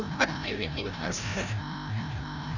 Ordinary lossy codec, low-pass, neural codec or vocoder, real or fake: none; 7.2 kHz; codec, 16 kHz, 0.5 kbps, FreqCodec, larger model; fake